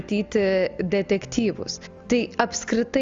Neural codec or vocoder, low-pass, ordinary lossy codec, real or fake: none; 7.2 kHz; Opus, 32 kbps; real